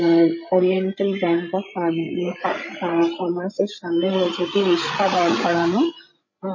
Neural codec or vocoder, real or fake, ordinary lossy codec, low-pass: codec, 16 kHz, 16 kbps, FreqCodec, larger model; fake; MP3, 32 kbps; 7.2 kHz